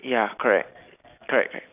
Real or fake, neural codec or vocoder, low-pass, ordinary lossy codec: real; none; 3.6 kHz; none